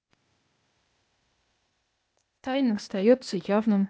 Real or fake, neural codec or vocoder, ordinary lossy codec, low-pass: fake; codec, 16 kHz, 0.8 kbps, ZipCodec; none; none